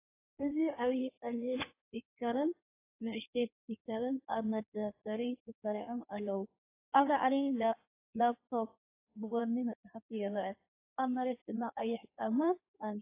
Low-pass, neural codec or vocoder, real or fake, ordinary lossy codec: 3.6 kHz; codec, 16 kHz in and 24 kHz out, 1.1 kbps, FireRedTTS-2 codec; fake; AAC, 24 kbps